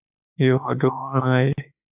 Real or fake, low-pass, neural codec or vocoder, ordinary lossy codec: fake; 3.6 kHz; autoencoder, 48 kHz, 32 numbers a frame, DAC-VAE, trained on Japanese speech; AAC, 32 kbps